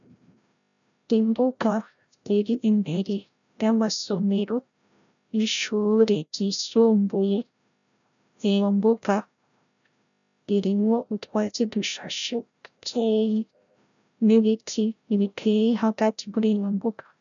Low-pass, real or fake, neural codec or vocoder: 7.2 kHz; fake; codec, 16 kHz, 0.5 kbps, FreqCodec, larger model